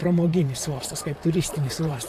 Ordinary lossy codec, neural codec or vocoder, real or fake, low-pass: AAC, 64 kbps; vocoder, 44.1 kHz, 128 mel bands, Pupu-Vocoder; fake; 14.4 kHz